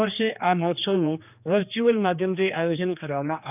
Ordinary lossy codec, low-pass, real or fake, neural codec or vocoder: none; 3.6 kHz; fake; codec, 16 kHz, 2 kbps, X-Codec, HuBERT features, trained on general audio